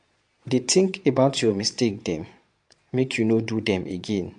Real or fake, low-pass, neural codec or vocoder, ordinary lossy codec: fake; 9.9 kHz; vocoder, 22.05 kHz, 80 mel bands, Vocos; MP3, 64 kbps